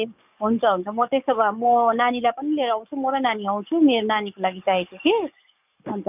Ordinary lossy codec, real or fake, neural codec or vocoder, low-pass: none; fake; codec, 44.1 kHz, 7.8 kbps, DAC; 3.6 kHz